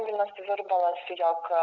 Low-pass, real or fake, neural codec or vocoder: 7.2 kHz; real; none